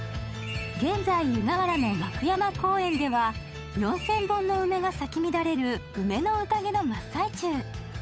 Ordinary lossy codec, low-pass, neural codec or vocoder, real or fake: none; none; codec, 16 kHz, 8 kbps, FunCodec, trained on Chinese and English, 25 frames a second; fake